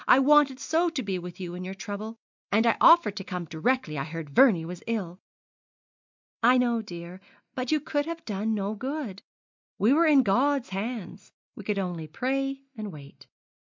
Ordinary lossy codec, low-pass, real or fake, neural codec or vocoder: MP3, 64 kbps; 7.2 kHz; real; none